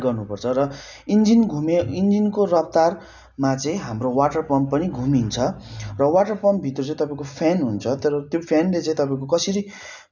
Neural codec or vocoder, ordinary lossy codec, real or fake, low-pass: none; none; real; 7.2 kHz